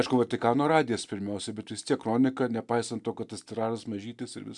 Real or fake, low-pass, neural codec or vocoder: real; 10.8 kHz; none